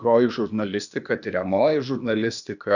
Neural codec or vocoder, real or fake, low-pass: codec, 16 kHz, 0.8 kbps, ZipCodec; fake; 7.2 kHz